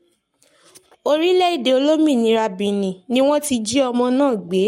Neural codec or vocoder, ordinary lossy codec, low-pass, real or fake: codec, 44.1 kHz, 7.8 kbps, Pupu-Codec; MP3, 64 kbps; 19.8 kHz; fake